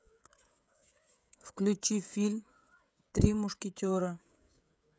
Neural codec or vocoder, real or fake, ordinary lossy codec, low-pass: codec, 16 kHz, 16 kbps, FreqCodec, smaller model; fake; none; none